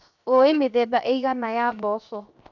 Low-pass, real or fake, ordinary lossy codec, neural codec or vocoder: 7.2 kHz; fake; none; codec, 16 kHz, 0.7 kbps, FocalCodec